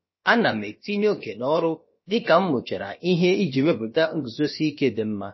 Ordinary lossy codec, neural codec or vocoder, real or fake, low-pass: MP3, 24 kbps; codec, 16 kHz, about 1 kbps, DyCAST, with the encoder's durations; fake; 7.2 kHz